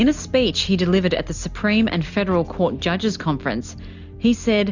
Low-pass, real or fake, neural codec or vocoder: 7.2 kHz; real; none